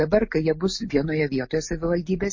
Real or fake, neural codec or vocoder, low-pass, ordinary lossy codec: real; none; 7.2 kHz; MP3, 32 kbps